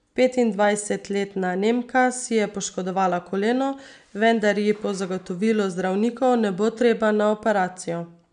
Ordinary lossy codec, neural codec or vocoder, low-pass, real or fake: none; none; 9.9 kHz; real